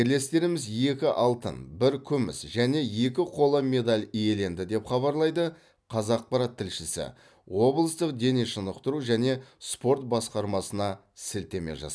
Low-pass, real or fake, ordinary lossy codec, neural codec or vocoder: none; real; none; none